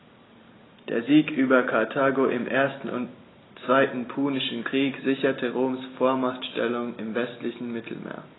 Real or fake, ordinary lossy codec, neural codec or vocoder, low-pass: real; AAC, 16 kbps; none; 7.2 kHz